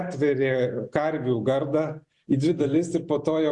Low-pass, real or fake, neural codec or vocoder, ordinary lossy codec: 10.8 kHz; real; none; Opus, 64 kbps